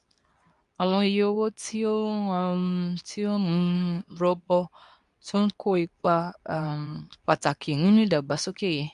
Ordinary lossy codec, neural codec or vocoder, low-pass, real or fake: none; codec, 24 kHz, 0.9 kbps, WavTokenizer, medium speech release version 2; 10.8 kHz; fake